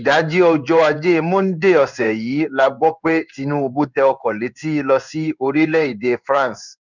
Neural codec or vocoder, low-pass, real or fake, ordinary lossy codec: codec, 16 kHz in and 24 kHz out, 1 kbps, XY-Tokenizer; 7.2 kHz; fake; none